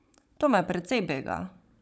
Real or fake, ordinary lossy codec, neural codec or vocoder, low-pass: fake; none; codec, 16 kHz, 16 kbps, FunCodec, trained on Chinese and English, 50 frames a second; none